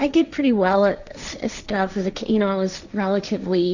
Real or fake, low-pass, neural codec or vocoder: fake; 7.2 kHz; codec, 16 kHz, 1.1 kbps, Voila-Tokenizer